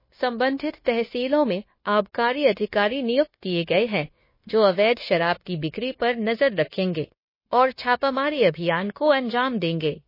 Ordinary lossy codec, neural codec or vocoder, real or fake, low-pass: MP3, 24 kbps; codec, 16 kHz, 0.9 kbps, LongCat-Audio-Codec; fake; 5.4 kHz